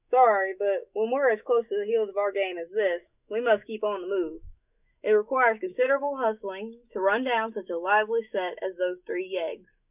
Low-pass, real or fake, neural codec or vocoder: 3.6 kHz; fake; autoencoder, 48 kHz, 128 numbers a frame, DAC-VAE, trained on Japanese speech